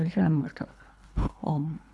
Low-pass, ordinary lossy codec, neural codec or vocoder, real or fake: none; none; codec, 24 kHz, 3 kbps, HILCodec; fake